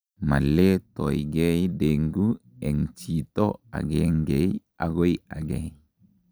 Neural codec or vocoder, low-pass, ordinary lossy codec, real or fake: none; none; none; real